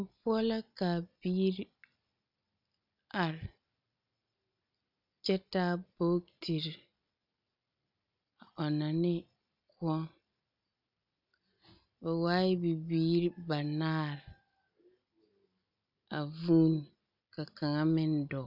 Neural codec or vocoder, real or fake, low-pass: none; real; 5.4 kHz